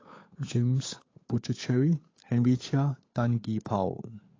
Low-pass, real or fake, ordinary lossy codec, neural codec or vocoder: 7.2 kHz; fake; AAC, 32 kbps; codec, 16 kHz, 8 kbps, FunCodec, trained on Chinese and English, 25 frames a second